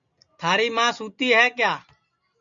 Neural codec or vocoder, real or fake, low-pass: none; real; 7.2 kHz